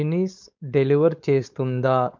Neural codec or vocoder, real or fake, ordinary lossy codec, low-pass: codec, 16 kHz, 4.8 kbps, FACodec; fake; MP3, 64 kbps; 7.2 kHz